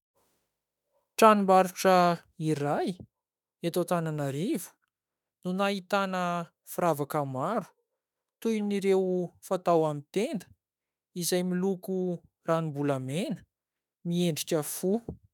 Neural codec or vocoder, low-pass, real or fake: autoencoder, 48 kHz, 32 numbers a frame, DAC-VAE, trained on Japanese speech; 19.8 kHz; fake